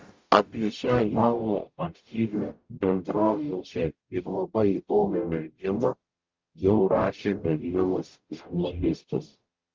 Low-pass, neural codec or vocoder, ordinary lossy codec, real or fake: 7.2 kHz; codec, 44.1 kHz, 0.9 kbps, DAC; Opus, 32 kbps; fake